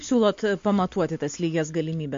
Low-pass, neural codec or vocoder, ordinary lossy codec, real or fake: 7.2 kHz; none; MP3, 48 kbps; real